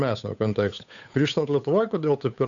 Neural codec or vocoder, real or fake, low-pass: codec, 16 kHz, 8 kbps, FunCodec, trained on Chinese and English, 25 frames a second; fake; 7.2 kHz